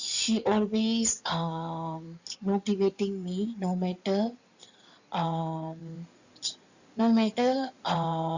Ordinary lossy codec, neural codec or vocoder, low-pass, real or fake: Opus, 64 kbps; codec, 16 kHz in and 24 kHz out, 2.2 kbps, FireRedTTS-2 codec; 7.2 kHz; fake